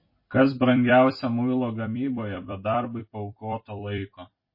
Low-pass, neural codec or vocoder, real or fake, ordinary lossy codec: 5.4 kHz; vocoder, 44.1 kHz, 128 mel bands every 256 samples, BigVGAN v2; fake; MP3, 24 kbps